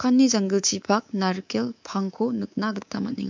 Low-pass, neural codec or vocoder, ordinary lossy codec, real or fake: 7.2 kHz; codec, 24 kHz, 3.1 kbps, DualCodec; MP3, 64 kbps; fake